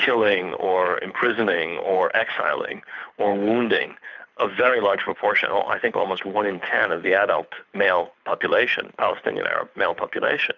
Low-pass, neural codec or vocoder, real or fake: 7.2 kHz; codec, 24 kHz, 6 kbps, HILCodec; fake